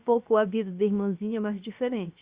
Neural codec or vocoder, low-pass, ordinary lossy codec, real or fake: codec, 16 kHz, 0.7 kbps, FocalCodec; 3.6 kHz; Opus, 64 kbps; fake